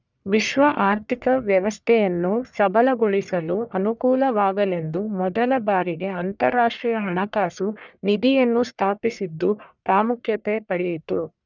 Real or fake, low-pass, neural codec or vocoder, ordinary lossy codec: fake; 7.2 kHz; codec, 44.1 kHz, 1.7 kbps, Pupu-Codec; none